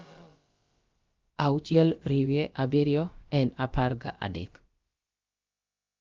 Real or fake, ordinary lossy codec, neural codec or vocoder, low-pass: fake; Opus, 32 kbps; codec, 16 kHz, about 1 kbps, DyCAST, with the encoder's durations; 7.2 kHz